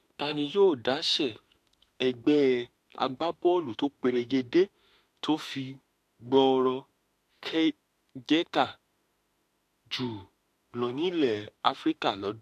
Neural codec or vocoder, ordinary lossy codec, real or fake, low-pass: autoencoder, 48 kHz, 32 numbers a frame, DAC-VAE, trained on Japanese speech; AAC, 64 kbps; fake; 14.4 kHz